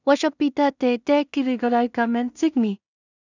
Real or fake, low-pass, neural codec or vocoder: fake; 7.2 kHz; codec, 16 kHz in and 24 kHz out, 0.4 kbps, LongCat-Audio-Codec, two codebook decoder